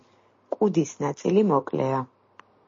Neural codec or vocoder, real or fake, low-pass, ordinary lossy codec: none; real; 7.2 kHz; MP3, 32 kbps